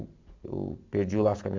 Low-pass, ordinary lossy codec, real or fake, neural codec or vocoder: 7.2 kHz; MP3, 64 kbps; real; none